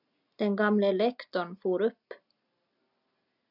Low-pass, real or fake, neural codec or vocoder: 5.4 kHz; real; none